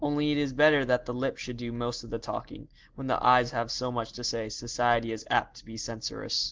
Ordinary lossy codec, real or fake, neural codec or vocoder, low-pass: Opus, 16 kbps; real; none; 7.2 kHz